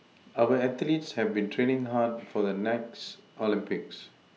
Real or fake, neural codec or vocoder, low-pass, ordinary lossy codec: real; none; none; none